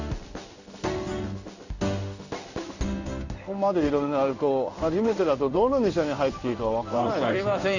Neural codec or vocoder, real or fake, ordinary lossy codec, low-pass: codec, 16 kHz in and 24 kHz out, 1 kbps, XY-Tokenizer; fake; none; 7.2 kHz